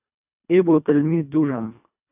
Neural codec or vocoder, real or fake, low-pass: codec, 24 kHz, 1.5 kbps, HILCodec; fake; 3.6 kHz